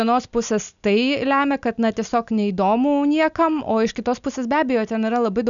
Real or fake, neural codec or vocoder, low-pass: real; none; 7.2 kHz